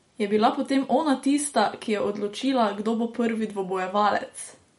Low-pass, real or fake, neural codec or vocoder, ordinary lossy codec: 19.8 kHz; real; none; MP3, 48 kbps